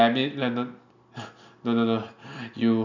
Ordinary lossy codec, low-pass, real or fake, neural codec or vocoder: none; 7.2 kHz; real; none